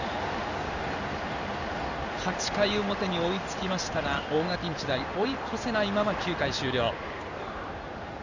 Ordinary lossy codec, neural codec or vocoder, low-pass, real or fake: none; codec, 16 kHz in and 24 kHz out, 1 kbps, XY-Tokenizer; 7.2 kHz; fake